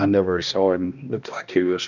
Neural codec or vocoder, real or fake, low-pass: codec, 16 kHz, 1 kbps, X-Codec, HuBERT features, trained on balanced general audio; fake; 7.2 kHz